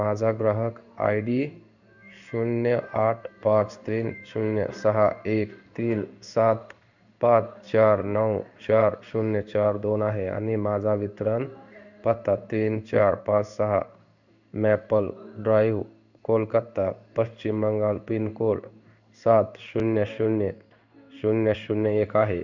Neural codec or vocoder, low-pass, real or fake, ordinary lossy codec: codec, 16 kHz in and 24 kHz out, 1 kbps, XY-Tokenizer; 7.2 kHz; fake; none